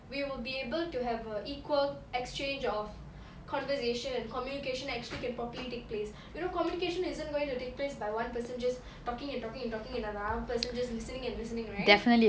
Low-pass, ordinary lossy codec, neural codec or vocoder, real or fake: none; none; none; real